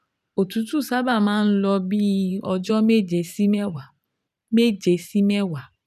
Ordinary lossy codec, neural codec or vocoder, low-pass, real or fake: none; vocoder, 44.1 kHz, 128 mel bands every 512 samples, BigVGAN v2; 14.4 kHz; fake